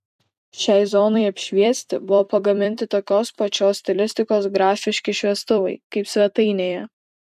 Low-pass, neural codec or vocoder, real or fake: 14.4 kHz; vocoder, 44.1 kHz, 128 mel bands every 256 samples, BigVGAN v2; fake